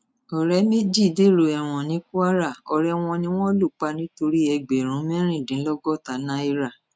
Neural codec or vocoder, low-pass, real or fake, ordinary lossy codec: none; none; real; none